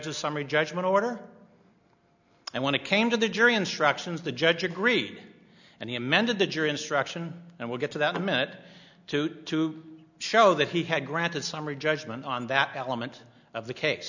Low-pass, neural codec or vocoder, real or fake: 7.2 kHz; none; real